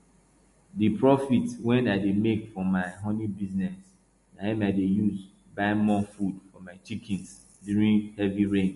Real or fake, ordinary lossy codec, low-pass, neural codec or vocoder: real; MP3, 48 kbps; 14.4 kHz; none